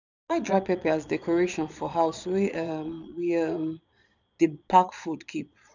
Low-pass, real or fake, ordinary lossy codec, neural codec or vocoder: 7.2 kHz; fake; none; vocoder, 22.05 kHz, 80 mel bands, Vocos